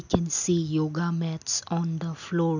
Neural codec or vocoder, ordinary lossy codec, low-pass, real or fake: none; none; 7.2 kHz; real